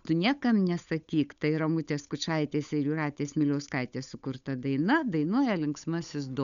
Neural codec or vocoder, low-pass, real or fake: codec, 16 kHz, 8 kbps, FunCodec, trained on Chinese and English, 25 frames a second; 7.2 kHz; fake